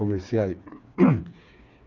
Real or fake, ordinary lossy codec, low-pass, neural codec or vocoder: fake; none; 7.2 kHz; codec, 16 kHz, 4 kbps, FreqCodec, smaller model